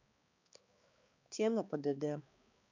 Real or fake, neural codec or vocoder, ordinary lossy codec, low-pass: fake; codec, 16 kHz, 2 kbps, X-Codec, HuBERT features, trained on balanced general audio; none; 7.2 kHz